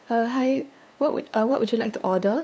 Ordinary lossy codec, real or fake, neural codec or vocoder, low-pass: none; fake; codec, 16 kHz, 2 kbps, FunCodec, trained on LibriTTS, 25 frames a second; none